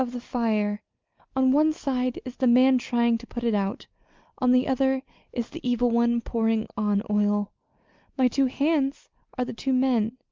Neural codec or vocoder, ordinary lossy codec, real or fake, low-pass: none; Opus, 24 kbps; real; 7.2 kHz